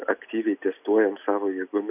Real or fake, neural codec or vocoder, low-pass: real; none; 3.6 kHz